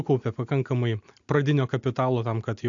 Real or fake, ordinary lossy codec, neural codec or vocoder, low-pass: real; AAC, 64 kbps; none; 7.2 kHz